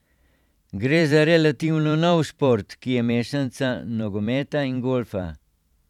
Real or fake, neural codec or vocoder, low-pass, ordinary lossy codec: fake; vocoder, 44.1 kHz, 128 mel bands every 512 samples, BigVGAN v2; 19.8 kHz; none